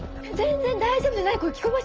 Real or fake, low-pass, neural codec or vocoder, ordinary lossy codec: real; 7.2 kHz; none; Opus, 24 kbps